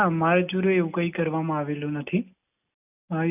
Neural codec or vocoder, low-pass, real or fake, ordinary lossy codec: none; 3.6 kHz; real; none